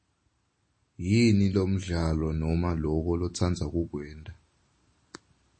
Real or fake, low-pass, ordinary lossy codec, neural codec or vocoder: real; 9.9 kHz; MP3, 32 kbps; none